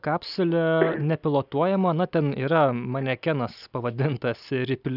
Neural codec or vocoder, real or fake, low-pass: none; real; 5.4 kHz